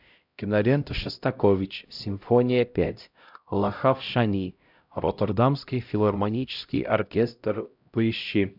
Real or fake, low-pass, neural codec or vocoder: fake; 5.4 kHz; codec, 16 kHz, 0.5 kbps, X-Codec, HuBERT features, trained on LibriSpeech